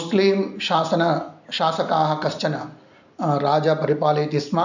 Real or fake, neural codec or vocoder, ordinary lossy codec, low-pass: real; none; none; 7.2 kHz